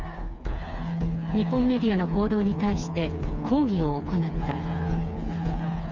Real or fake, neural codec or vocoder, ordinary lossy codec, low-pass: fake; codec, 16 kHz, 4 kbps, FreqCodec, smaller model; none; 7.2 kHz